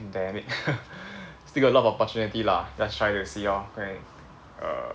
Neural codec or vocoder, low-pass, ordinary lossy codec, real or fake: none; none; none; real